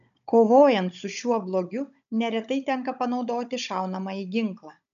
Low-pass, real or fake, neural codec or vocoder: 7.2 kHz; fake; codec, 16 kHz, 16 kbps, FunCodec, trained on Chinese and English, 50 frames a second